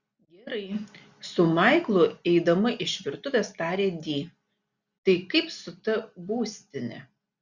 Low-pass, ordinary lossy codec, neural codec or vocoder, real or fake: 7.2 kHz; Opus, 64 kbps; none; real